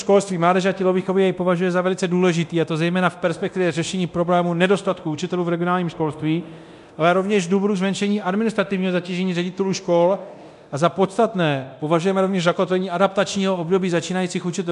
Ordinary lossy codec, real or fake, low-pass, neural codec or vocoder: MP3, 96 kbps; fake; 10.8 kHz; codec, 24 kHz, 0.9 kbps, DualCodec